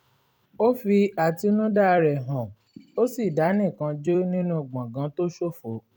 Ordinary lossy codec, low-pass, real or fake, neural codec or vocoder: none; 19.8 kHz; fake; vocoder, 44.1 kHz, 128 mel bands every 256 samples, BigVGAN v2